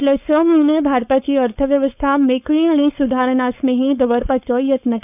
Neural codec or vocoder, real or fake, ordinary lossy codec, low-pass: codec, 16 kHz, 4.8 kbps, FACodec; fake; none; 3.6 kHz